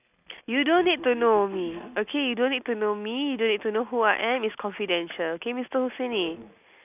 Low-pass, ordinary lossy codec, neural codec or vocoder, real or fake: 3.6 kHz; none; none; real